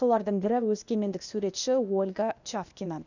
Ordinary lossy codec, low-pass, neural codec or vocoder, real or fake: none; 7.2 kHz; codec, 16 kHz, 0.8 kbps, ZipCodec; fake